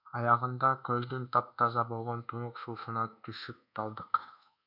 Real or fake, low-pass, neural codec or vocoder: fake; 5.4 kHz; codec, 24 kHz, 1.2 kbps, DualCodec